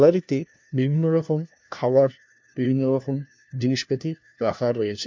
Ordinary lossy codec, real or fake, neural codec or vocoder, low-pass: MP3, 64 kbps; fake; codec, 16 kHz, 1 kbps, FunCodec, trained on LibriTTS, 50 frames a second; 7.2 kHz